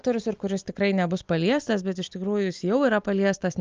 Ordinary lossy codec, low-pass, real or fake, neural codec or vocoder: Opus, 24 kbps; 7.2 kHz; real; none